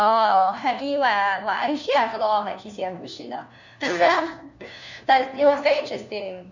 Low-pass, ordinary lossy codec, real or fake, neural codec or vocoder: 7.2 kHz; none; fake; codec, 16 kHz, 1 kbps, FunCodec, trained on LibriTTS, 50 frames a second